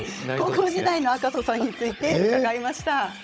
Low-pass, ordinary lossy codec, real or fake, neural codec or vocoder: none; none; fake; codec, 16 kHz, 16 kbps, FunCodec, trained on Chinese and English, 50 frames a second